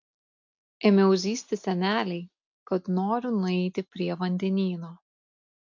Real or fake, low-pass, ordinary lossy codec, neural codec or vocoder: real; 7.2 kHz; MP3, 64 kbps; none